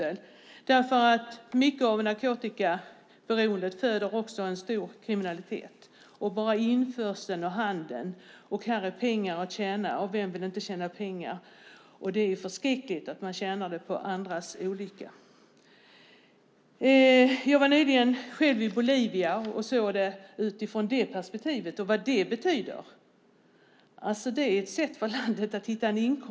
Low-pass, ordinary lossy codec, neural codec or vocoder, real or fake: none; none; none; real